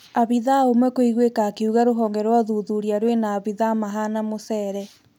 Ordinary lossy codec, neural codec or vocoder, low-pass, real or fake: none; none; 19.8 kHz; real